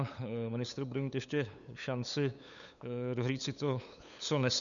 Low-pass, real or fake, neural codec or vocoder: 7.2 kHz; fake; codec, 16 kHz, 8 kbps, FunCodec, trained on LibriTTS, 25 frames a second